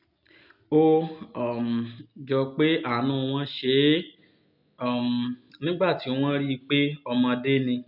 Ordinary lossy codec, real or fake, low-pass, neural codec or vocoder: none; real; 5.4 kHz; none